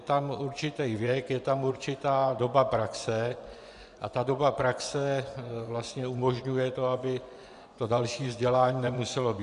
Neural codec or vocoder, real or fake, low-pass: vocoder, 24 kHz, 100 mel bands, Vocos; fake; 10.8 kHz